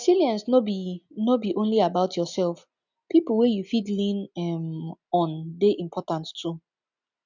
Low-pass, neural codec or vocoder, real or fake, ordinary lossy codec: 7.2 kHz; none; real; none